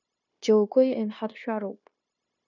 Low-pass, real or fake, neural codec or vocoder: 7.2 kHz; fake; codec, 16 kHz, 0.9 kbps, LongCat-Audio-Codec